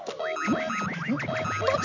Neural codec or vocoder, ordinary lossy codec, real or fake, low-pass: none; none; real; 7.2 kHz